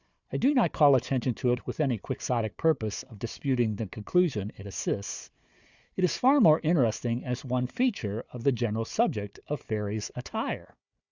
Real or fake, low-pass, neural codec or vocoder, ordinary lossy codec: fake; 7.2 kHz; codec, 16 kHz, 4 kbps, FunCodec, trained on Chinese and English, 50 frames a second; Opus, 64 kbps